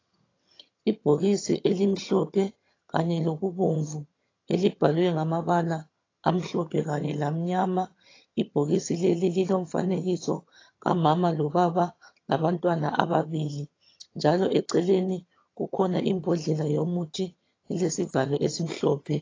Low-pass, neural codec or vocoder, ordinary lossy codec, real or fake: 7.2 kHz; vocoder, 22.05 kHz, 80 mel bands, HiFi-GAN; AAC, 32 kbps; fake